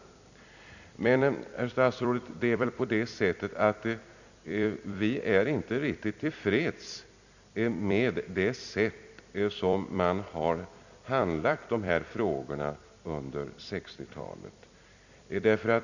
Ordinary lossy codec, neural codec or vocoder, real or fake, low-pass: none; vocoder, 44.1 kHz, 128 mel bands every 256 samples, BigVGAN v2; fake; 7.2 kHz